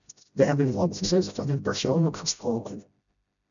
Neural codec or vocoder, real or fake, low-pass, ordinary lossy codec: codec, 16 kHz, 0.5 kbps, FreqCodec, smaller model; fake; 7.2 kHz; MP3, 96 kbps